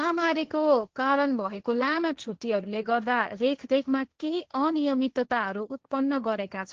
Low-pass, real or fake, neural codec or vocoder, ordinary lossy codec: 7.2 kHz; fake; codec, 16 kHz, 1.1 kbps, Voila-Tokenizer; Opus, 16 kbps